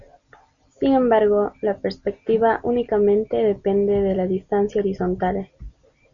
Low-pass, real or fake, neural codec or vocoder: 7.2 kHz; real; none